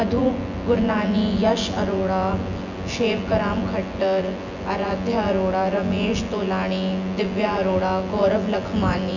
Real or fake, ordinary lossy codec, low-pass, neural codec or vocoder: fake; none; 7.2 kHz; vocoder, 24 kHz, 100 mel bands, Vocos